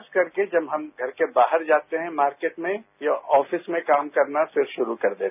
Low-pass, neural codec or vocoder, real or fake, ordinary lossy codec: 3.6 kHz; none; real; none